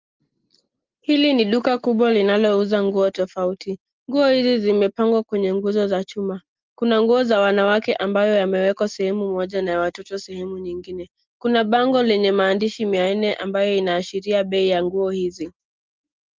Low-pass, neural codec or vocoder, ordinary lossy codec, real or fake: 7.2 kHz; none; Opus, 32 kbps; real